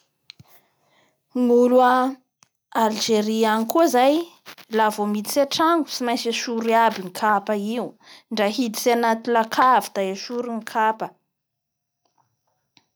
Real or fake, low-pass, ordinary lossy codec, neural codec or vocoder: real; none; none; none